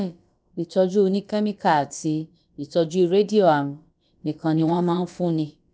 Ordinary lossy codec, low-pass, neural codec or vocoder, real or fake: none; none; codec, 16 kHz, about 1 kbps, DyCAST, with the encoder's durations; fake